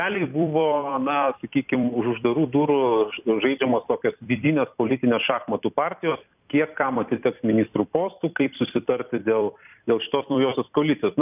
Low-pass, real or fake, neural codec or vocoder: 3.6 kHz; fake; vocoder, 44.1 kHz, 128 mel bands every 256 samples, BigVGAN v2